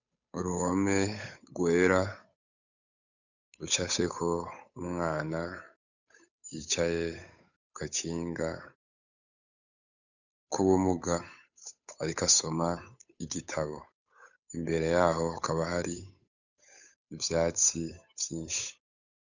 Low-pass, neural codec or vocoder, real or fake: 7.2 kHz; codec, 16 kHz, 8 kbps, FunCodec, trained on Chinese and English, 25 frames a second; fake